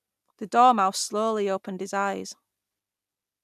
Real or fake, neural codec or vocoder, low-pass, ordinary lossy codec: real; none; 14.4 kHz; none